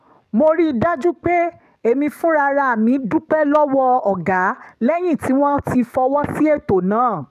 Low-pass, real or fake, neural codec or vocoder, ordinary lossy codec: 14.4 kHz; fake; codec, 44.1 kHz, 7.8 kbps, DAC; none